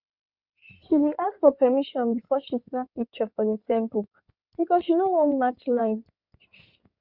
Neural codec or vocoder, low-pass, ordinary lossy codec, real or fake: codec, 16 kHz in and 24 kHz out, 2.2 kbps, FireRedTTS-2 codec; 5.4 kHz; Opus, 64 kbps; fake